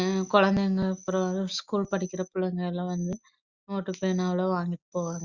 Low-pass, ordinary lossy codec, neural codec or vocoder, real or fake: 7.2 kHz; Opus, 64 kbps; none; real